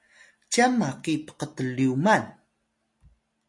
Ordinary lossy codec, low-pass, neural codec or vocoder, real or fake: MP3, 48 kbps; 10.8 kHz; none; real